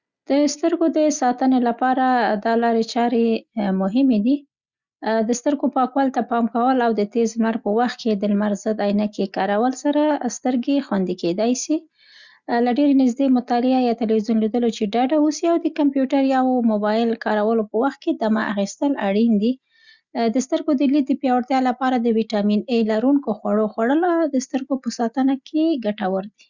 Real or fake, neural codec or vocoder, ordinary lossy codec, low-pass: real; none; Opus, 64 kbps; 7.2 kHz